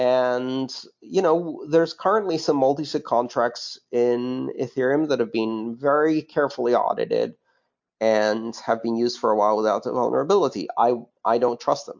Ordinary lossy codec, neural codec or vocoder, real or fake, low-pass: MP3, 48 kbps; none; real; 7.2 kHz